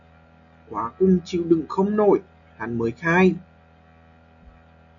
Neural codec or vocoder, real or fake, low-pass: none; real; 7.2 kHz